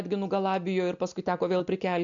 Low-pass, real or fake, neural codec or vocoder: 7.2 kHz; real; none